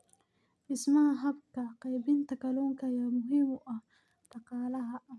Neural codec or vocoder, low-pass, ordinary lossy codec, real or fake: none; none; none; real